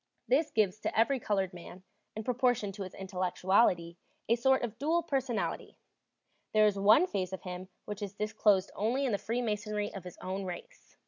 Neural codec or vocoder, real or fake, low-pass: none; real; 7.2 kHz